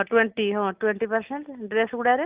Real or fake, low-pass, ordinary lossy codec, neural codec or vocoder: real; 3.6 kHz; Opus, 24 kbps; none